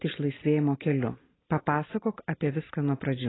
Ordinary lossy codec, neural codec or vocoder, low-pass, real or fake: AAC, 16 kbps; none; 7.2 kHz; real